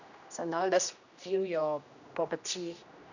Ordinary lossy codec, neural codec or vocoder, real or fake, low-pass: none; codec, 16 kHz, 1 kbps, X-Codec, HuBERT features, trained on general audio; fake; 7.2 kHz